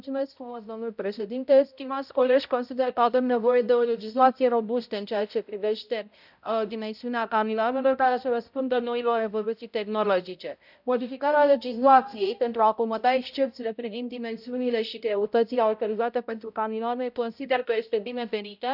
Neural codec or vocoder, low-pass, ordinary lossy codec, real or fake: codec, 16 kHz, 0.5 kbps, X-Codec, HuBERT features, trained on balanced general audio; 5.4 kHz; none; fake